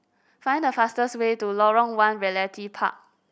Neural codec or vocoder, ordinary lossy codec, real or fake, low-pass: none; none; real; none